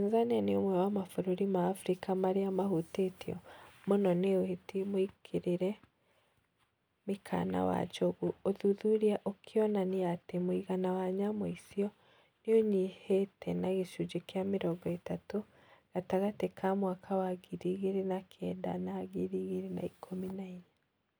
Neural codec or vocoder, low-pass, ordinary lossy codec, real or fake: none; none; none; real